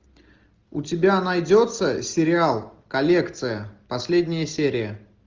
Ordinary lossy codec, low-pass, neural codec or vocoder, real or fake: Opus, 32 kbps; 7.2 kHz; none; real